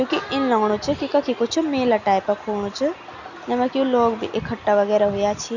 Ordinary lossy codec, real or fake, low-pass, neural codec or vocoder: none; real; 7.2 kHz; none